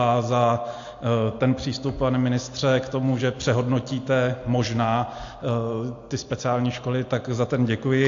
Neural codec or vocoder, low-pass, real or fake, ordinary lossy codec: none; 7.2 kHz; real; AAC, 48 kbps